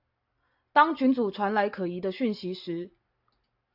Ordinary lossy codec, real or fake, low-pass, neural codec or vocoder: AAC, 48 kbps; real; 5.4 kHz; none